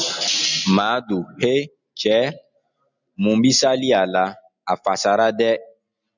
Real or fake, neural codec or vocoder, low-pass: real; none; 7.2 kHz